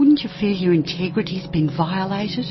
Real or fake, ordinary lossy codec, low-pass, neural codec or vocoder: real; MP3, 24 kbps; 7.2 kHz; none